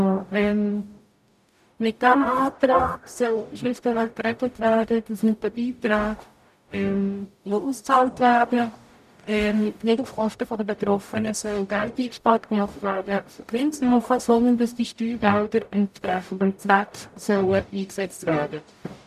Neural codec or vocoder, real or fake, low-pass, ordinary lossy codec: codec, 44.1 kHz, 0.9 kbps, DAC; fake; 14.4 kHz; none